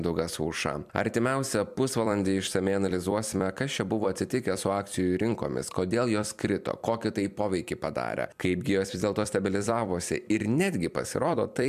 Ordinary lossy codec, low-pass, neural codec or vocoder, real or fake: MP3, 96 kbps; 14.4 kHz; vocoder, 44.1 kHz, 128 mel bands every 256 samples, BigVGAN v2; fake